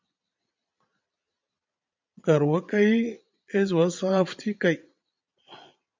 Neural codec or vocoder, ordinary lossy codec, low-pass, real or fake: vocoder, 22.05 kHz, 80 mel bands, Vocos; MP3, 64 kbps; 7.2 kHz; fake